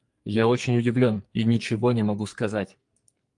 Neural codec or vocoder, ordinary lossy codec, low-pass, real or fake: codec, 44.1 kHz, 2.6 kbps, SNAC; Opus, 32 kbps; 10.8 kHz; fake